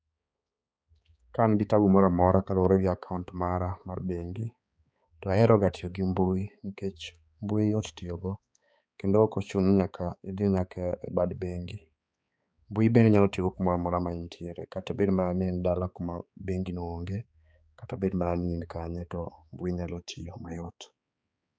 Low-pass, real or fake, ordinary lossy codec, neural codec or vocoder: none; fake; none; codec, 16 kHz, 4 kbps, X-Codec, HuBERT features, trained on balanced general audio